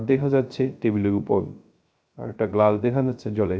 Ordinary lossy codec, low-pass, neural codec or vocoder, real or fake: none; none; codec, 16 kHz, 0.3 kbps, FocalCodec; fake